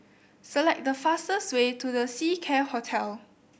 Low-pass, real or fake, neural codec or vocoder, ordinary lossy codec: none; real; none; none